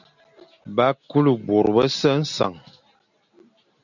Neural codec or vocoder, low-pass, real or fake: none; 7.2 kHz; real